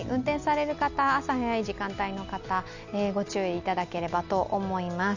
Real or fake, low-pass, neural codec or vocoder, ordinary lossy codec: real; 7.2 kHz; none; none